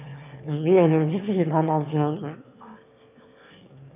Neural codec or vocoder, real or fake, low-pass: autoencoder, 22.05 kHz, a latent of 192 numbers a frame, VITS, trained on one speaker; fake; 3.6 kHz